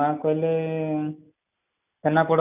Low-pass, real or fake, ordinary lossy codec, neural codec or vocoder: 3.6 kHz; real; none; none